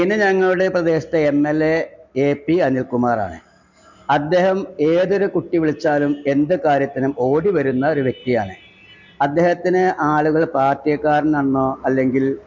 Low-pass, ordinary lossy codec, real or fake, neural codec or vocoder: 7.2 kHz; none; real; none